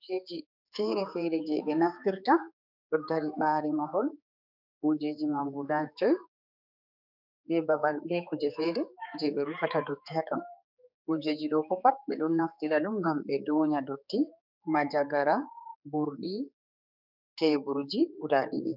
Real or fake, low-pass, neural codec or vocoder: fake; 5.4 kHz; codec, 16 kHz, 4 kbps, X-Codec, HuBERT features, trained on general audio